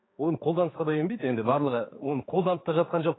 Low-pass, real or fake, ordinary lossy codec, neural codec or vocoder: 7.2 kHz; fake; AAC, 16 kbps; codec, 16 kHz, 4 kbps, X-Codec, HuBERT features, trained on general audio